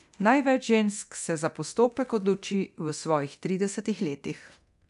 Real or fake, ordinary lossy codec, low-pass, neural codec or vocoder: fake; MP3, 96 kbps; 10.8 kHz; codec, 24 kHz, 0.9 kbps, DualCodec